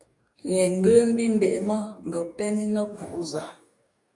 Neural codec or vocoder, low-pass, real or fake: codec, 44.1 kHz, 2.6 kbps, DAC; 10.8 kHz; fake